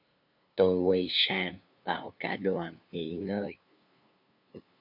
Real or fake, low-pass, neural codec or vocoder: fake; 5.4 kHz; codec, 16 kHz, 2 kbps, FunCodec, trained on LibriTTS, 25 frames a second